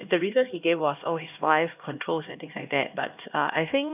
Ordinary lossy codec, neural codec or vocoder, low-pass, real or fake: none; codec, 16 kHz, 1 kbps, X-Codec, HuBERT features, trained on LibriSpeech; 3.6 kHz; fake